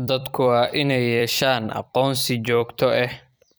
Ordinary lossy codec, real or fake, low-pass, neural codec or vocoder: none; fake; none; vocoder, 44.1 kHz, 128 mel bands every 256 samples, BigVGAN v2